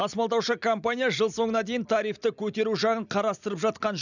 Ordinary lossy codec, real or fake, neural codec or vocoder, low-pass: none; real; none; 7.2 kHz